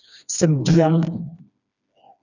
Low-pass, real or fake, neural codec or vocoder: 7.2 kHz; fake; codec, 16 kHz, 2 kbps, FreqCodec, smaller model